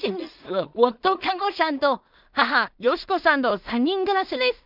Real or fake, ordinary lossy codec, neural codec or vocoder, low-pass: fake; none; codec, 16 kHz in and 24 kHz out, 0.4 kbps, LongCat-Audio-Codec, two codebook decoder; 5.4 kHz